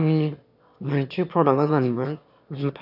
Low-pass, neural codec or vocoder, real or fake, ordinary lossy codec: 5.4 kHz; autoencoder, 22.05 kHz, a latent of 192 numbers a frame, VITS, trained on one speaker; fake; none